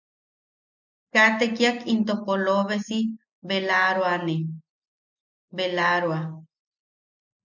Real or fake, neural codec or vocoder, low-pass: real; none; 7.2 kHz